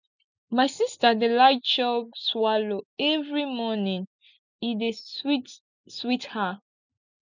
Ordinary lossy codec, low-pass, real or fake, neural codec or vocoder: none; 7.2 kHz; real; none